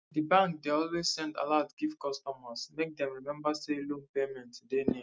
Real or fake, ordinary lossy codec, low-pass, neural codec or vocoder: real; none; none; none